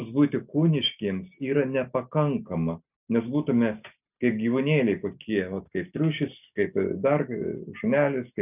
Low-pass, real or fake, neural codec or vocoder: 3.6 kHz; real; none